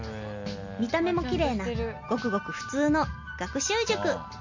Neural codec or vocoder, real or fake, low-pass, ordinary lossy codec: none; real; 7.2 kHz; MP3, 48 kbps